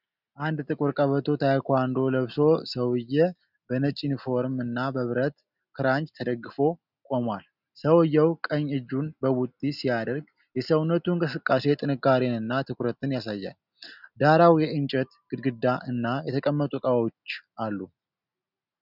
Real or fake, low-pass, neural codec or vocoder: real; 5.4 kHz; none